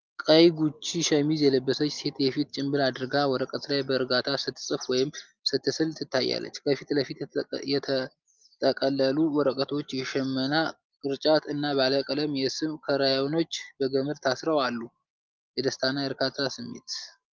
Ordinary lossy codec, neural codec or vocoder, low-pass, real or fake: Opus, 32 kbps; none; 7.2 kHz; real